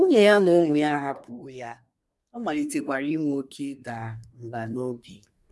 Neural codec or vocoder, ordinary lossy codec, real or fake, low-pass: codec, 24 kHz, 1 kbps, SNAC; none; fake; none